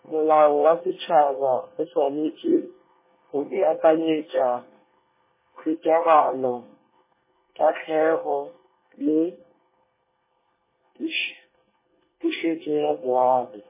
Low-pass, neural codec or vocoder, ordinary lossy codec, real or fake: 3.6 kHz; codec, 24 kHz, 1 kbps, SNAC; MP3, 16 kbps; fake